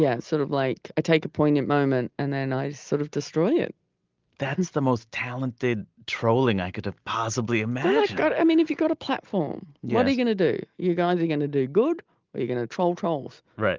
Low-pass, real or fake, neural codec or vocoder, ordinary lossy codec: 7.2 kHz; real; none; Opus, 24 kbps